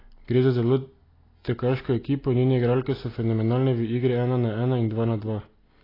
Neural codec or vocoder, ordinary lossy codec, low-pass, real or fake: none; AAC, 24 kbps; 5.4 kHz; real